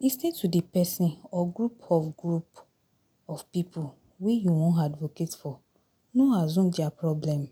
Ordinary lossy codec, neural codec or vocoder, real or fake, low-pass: none; none; real; 19.8 kHz